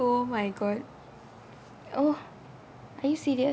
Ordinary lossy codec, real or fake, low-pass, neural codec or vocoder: none; real; none; none